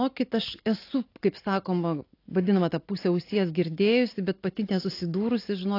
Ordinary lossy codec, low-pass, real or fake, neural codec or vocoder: AAC, 32 kbps; 5.4 kHz; real; none